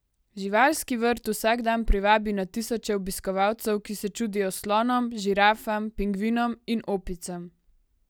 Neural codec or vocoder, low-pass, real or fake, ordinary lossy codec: none; none; real; none